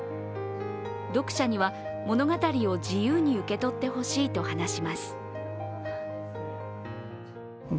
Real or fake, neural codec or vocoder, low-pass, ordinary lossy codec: real; none; none; none